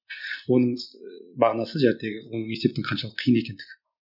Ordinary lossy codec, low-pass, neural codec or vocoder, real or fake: none; 5.4 kHz; none; real